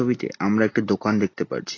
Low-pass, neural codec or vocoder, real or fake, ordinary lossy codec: 7.2 kHz; none; real; AAC, 32 kbps